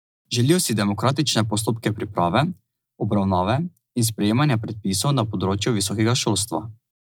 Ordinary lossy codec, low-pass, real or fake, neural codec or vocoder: none; none; real; none